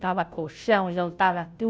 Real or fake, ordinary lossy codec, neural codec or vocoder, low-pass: fake; none; codec, 16 kHz, 0.5 kbps, FunCodec, trained on Chinese and English, 25 frames a second; none